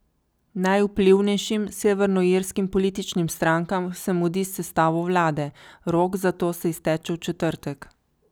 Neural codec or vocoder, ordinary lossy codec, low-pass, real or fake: none; none; none; real